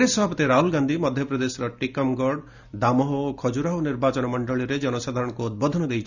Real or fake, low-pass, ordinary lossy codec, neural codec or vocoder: real; 7.2 kHz; none; none